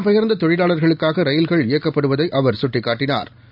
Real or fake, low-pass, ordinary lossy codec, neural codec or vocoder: real; 5.4 kHz; none; none